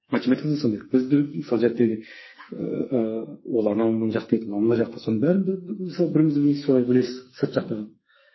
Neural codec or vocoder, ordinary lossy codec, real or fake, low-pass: codec, 44.1 kHz, 2.6 kbps, SNAC; MP3, 24 kbps; fake; 7.2 kHz